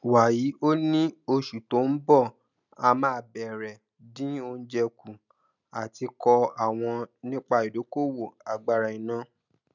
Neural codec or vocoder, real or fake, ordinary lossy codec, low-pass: none; real; none; 7.2 kHz